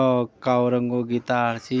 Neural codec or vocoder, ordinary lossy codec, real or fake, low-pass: none; none; real; none